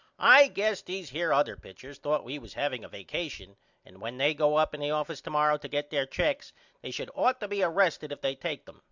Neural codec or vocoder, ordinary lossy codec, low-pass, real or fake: none; Opus, 64 kbps; 7.2 kHz; real